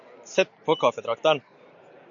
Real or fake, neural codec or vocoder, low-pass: real; none; 7.2 kHz